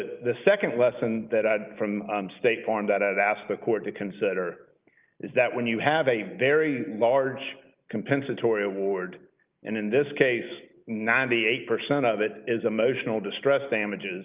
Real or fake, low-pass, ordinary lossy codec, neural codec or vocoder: real; 3.6 kHz; Opus, 32 kbps; none